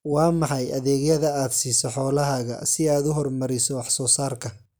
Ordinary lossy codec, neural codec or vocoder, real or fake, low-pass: none; none; real; none